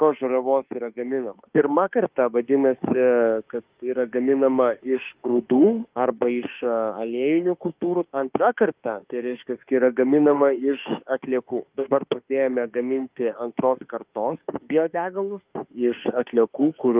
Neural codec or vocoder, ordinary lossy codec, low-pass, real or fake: autoencoder, 48 kHz, 32 numbers a frame, DAC-VAE, trained on Japanese speech; Opus, 32 kbps; 3.6 kHz; fake